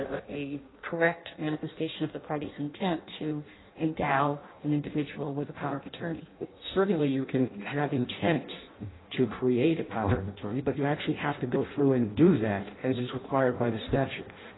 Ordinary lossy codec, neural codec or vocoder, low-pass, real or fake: AAC, 16 kbps; codec, 16 kHz in and 24 kHz out, 0.6 kbps, FireRedTTS-2 codec; 7.2 kHz; fake